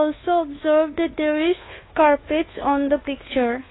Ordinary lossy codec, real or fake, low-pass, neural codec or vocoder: AAC, 16 kbps; fake; 7.2 kHz; codec, 24 kHz, 1.2 kbps, DualCodec